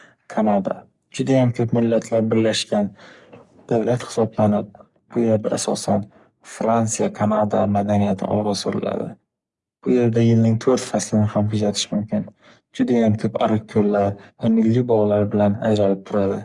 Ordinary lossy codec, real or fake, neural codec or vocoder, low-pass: Opus, 64 kbps; fake; codec, 44.1 kHz, 3.4 kbps, Pupu-Codec; 10.8 kHz